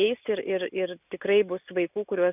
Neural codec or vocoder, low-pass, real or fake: none; 3.6 kHz; real